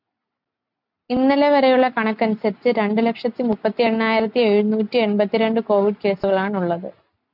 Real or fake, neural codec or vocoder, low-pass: real; none; 5.4 kHz